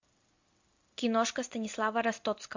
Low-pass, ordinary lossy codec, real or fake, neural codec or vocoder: 7.2 kHz; MP3, 48 kbps; real; none